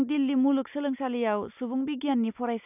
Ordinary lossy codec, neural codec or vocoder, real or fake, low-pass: none; none; real; 3.6 kHz